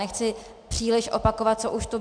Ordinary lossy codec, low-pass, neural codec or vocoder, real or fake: MP3, 96 kbps; 9.9 kHz; none; real